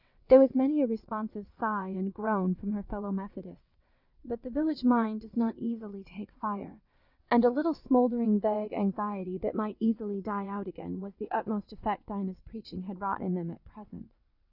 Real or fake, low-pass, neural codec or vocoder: fake; 5.4 kHz; vocoder, 22.05 kHz, 80 mel bands, WaveNeXt